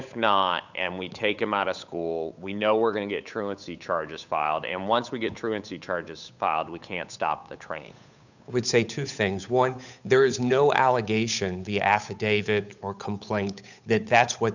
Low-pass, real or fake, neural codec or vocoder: 7.2 kHz; fake; codec, 16 kHz, 8 kbps, FunCodec, trained on Chinese and English, 25 frames a second